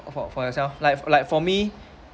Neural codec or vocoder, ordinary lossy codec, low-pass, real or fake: none; none; none; real